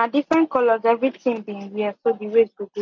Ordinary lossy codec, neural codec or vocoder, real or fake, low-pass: MP3, 48 kbps; none; real; 7.2 kHz